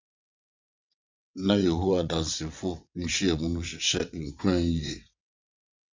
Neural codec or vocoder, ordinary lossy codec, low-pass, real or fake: vocoder, 22.05 kHz, 80 mel bands, WaveNeXt; MP3, 64 kbps; 7.2 kHz; fake